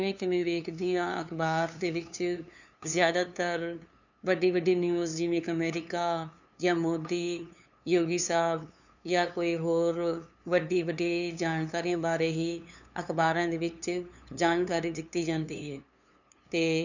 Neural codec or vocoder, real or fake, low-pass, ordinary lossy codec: codec, 16 kHz, 2 kbps, FunCodec, trained on LibriTTS, 25 frames a second; fake; 7.2 kHz; none